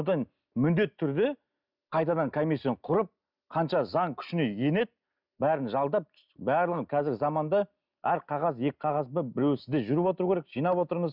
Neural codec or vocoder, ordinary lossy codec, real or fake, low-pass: none; none; real; 5.4 kHz